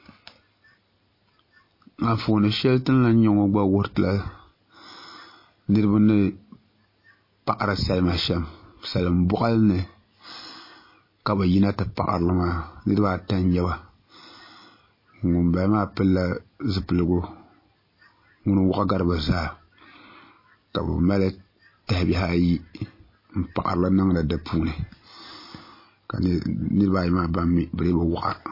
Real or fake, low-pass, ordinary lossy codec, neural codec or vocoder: real; 5.4 kHz; MP3, 24 kbps; none